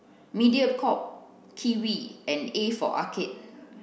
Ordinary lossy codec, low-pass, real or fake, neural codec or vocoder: none; none; real; none